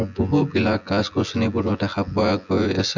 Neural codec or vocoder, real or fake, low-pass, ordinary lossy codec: vocoder, 24 kHz, 100 mel bands, Vocos; fake; 7.2 kHz; none